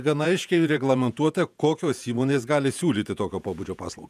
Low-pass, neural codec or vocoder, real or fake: 14.4 kHz; vocoder, 48 kHz, 128 mel bands, Vocos; fake